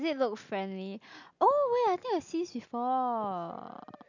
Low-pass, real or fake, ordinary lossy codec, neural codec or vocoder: 7.2 kHz; real; none; none